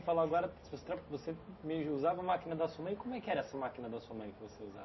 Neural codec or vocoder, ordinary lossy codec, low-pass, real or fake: none; MP3, 24 kbps; 7.2 kHz; real